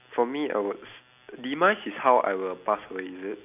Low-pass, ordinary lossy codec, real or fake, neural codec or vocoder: 3.6 kHz; none; real; none